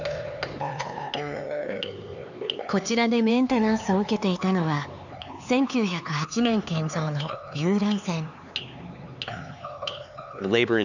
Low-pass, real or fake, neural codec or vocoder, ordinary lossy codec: 7.2 kHz; fake; codec, 16 kHz, 4 kbps, X-Codec, HuBERT features, trained on LibriSpeech; none